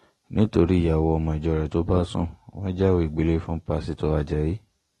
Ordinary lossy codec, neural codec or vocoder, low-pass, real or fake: AAC, 32 kbps; none; 19.8 kHz; real